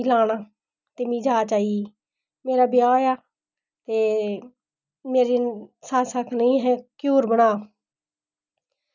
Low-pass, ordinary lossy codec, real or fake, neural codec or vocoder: 7.2 kHz; none; real; none